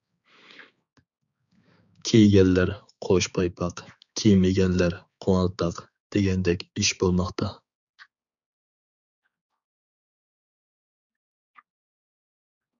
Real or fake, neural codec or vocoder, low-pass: fake; codec, 16 kHz, 4 kbps, X-Codec, HuBERT features, trained on balanced general audio; 7.2 kHz